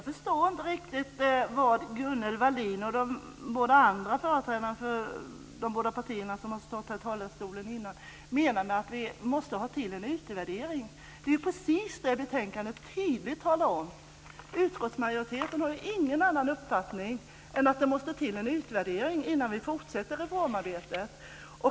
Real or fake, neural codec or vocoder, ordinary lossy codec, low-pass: real; none; none; none